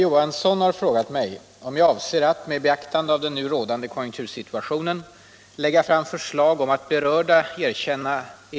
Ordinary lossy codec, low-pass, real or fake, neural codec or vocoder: none; none; real; none